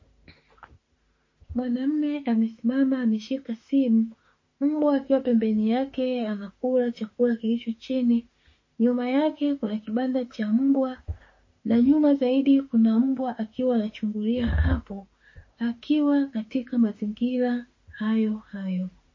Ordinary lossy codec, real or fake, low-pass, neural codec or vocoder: MP3, 32 kbps; fake; 7.2 kHz; autoencoder, 48 kHz, 32 numbers a frame, DAC-VAE, trained on Japanese speech